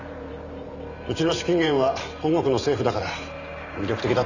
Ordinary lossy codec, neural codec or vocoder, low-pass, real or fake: none; vocoder, 44.1 kHz, 128 mel bands every 512 samples, BigVGAN v2; 7.2 kHz; fake